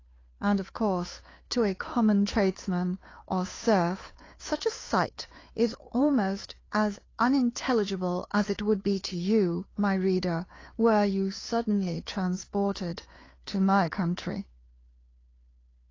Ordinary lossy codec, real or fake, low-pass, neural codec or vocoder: AAC, 32 kbps; fake; 7.2 kHz; codec, 16 kHz, 2 kbps, FunCodec, trained on Chinese and English, 25 frames a second